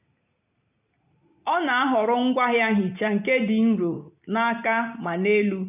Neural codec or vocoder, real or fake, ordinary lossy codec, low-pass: none; real; none; 3.6 kHz